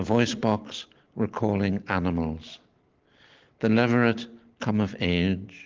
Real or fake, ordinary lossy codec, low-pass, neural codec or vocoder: real; Opus, 16 kbps; 7.2 kHz; none